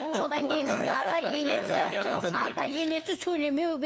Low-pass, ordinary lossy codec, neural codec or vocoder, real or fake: none; none; codec, 16 kHz, 2 kbps, FunCodec, trained on LibriTTS, 25 frames a second; fake